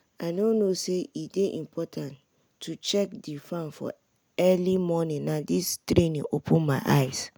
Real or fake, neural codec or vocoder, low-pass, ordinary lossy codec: real; none; none; none